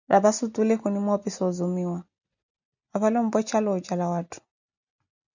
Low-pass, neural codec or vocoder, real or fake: 7.2 kHz; none; real